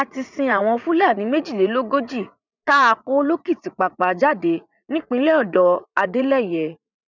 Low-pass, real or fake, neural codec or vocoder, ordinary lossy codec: 7.2 kHz; fake; vocoder, 44.1 kHz, 80 mel bands, Vocos; none